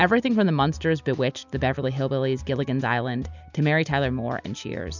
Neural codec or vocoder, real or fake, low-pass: none; real; 7.2 kHz